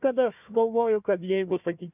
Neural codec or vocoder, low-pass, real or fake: codec, 16 kHz, 1 kbps, FunCodec, trained on Chinese and English, 50 frames a second; 3.6 kHz; fake